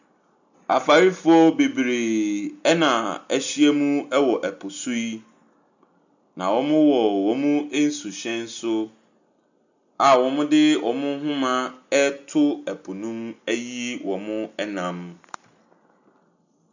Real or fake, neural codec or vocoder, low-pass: real; none; 7.2 kHz